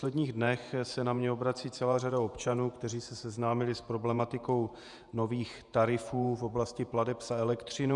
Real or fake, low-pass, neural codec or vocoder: fake; 10.8 kHz; vocoder, 48 kHz, 128 mel bands, Vocos